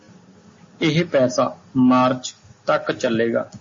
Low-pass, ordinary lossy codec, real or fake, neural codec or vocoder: 7.2 kHz; MP3, 32 kbps; real; none